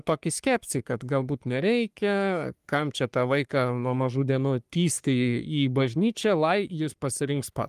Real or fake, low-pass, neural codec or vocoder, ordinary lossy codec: fake; 14.4 kHz; codec, 44.1 kHz, 3.4 kbps, Pupu-Codec; Opus, 32 kbps